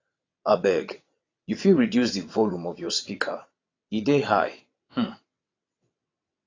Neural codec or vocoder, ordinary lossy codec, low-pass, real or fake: vocoder, 22.05 kHz, 80 mel bands, WaveNeXt; AAC, 32 kbps; 7.2 kHz; fake